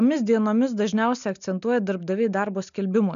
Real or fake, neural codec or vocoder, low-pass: real; none; 7.2 kHz